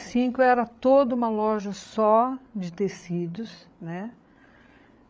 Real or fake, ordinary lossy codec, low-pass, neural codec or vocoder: fake; none; none; codec, 16 kHz, 8 kbps, FreqCodec, larger model